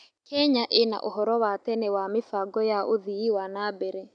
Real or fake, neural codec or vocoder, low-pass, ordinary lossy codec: real; none; 9.9 kHz; none